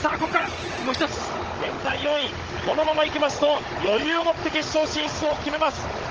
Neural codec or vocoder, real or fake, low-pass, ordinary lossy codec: codec, 16 kHz, 16 kbps, FunCodec, trained on Chinese and English, 50 frames a second; fake; 7.2 kHz; Opus, 16 kbps